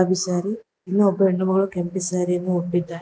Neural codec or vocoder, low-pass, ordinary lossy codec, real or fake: none; none; none; real